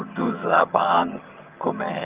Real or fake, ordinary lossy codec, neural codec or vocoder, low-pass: fake; Opus, 32 kbps; vocoder, 22.05 kHz, 80 mel bands, HiFi-GAN; 3.6 kHz